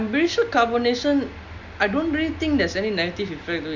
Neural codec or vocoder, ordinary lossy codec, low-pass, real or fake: none; none; 7.2 kHz; real